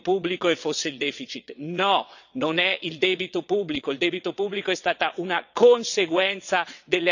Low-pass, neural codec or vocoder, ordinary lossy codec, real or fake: 7.2 kHz; vocoder, 22.05 kHz, 80 mel bands, WaveNeXt; none; fake